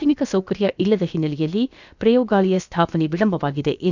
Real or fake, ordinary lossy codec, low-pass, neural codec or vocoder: fake; none; 7.2 kHz; codec, 16 kHz, about 1 kbps, DyCAST, with the encoder's durations